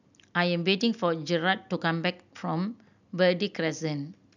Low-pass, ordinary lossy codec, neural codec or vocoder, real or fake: 7.2 kHz; none; none; real